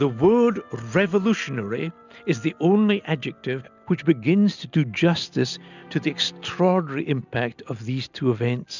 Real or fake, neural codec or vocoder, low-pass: real; none; 7.2 kHz